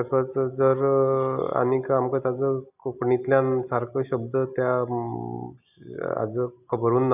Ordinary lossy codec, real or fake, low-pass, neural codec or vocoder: none; real; 3.6 kHz; none